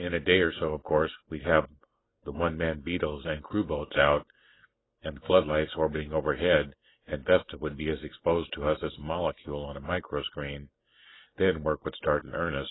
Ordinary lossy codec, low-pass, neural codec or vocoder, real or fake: AAC, 16 kbps; 7.2 kHz; none; real